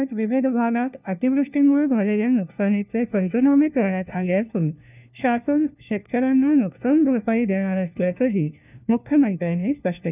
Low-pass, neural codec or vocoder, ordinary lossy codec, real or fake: 3.6 kHz; codec, 16 kHz, 1 kbps, FunCodec, trained on LibriTTS, 50 frames a second; none; fake